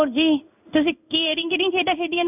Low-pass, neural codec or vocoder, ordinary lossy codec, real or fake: 3.6 kHz; codec, 16 kHz in and 24 kHz out, 1 kbps, XY-Tokenizer; none; fake